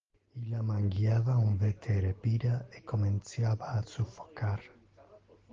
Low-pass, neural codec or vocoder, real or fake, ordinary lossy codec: 7.2 kHz; none; real; Opus, 16 kbps